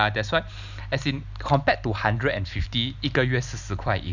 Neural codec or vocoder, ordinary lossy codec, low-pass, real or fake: none; none; 7.2 kHz; real